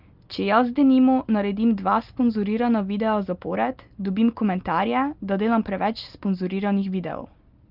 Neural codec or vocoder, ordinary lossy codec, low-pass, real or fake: none; Opus, 24 kbps; 5.4 kHz; real